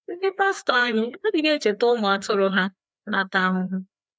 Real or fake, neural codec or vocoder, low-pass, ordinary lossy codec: fake; codec, 16 kHz, 2 kbps, FreqCodec, larger model; none; none